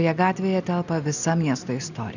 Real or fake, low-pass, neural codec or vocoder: real; 7.2 kHz; none